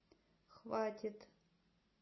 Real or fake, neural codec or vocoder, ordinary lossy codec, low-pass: real; none; MP3, 24 kbps; 7.2 kHz